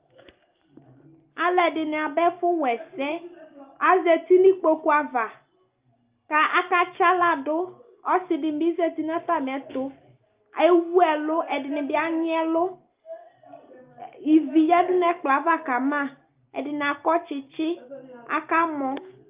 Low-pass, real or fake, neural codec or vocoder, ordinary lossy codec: 3.6 kHz; real; none; Opus, 24 kbps